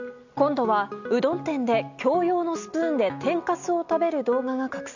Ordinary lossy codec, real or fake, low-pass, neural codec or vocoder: none; real; 7.2 kHz; none